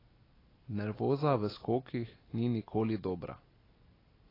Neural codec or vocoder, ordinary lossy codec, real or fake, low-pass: none; AAC, 24 kbps; real; 5.4 kHz